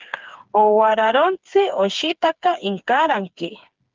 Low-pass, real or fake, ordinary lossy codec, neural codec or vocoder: 7.2 kHz; fake; Opus, 32 kbps; codec, 16 kHz, 4 kbps, FreqCodec, smaller model